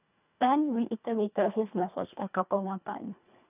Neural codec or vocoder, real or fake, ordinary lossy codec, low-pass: codec, 24 kHz, 1.5 kbps, HILCodec; fake; none; 3.6 kHz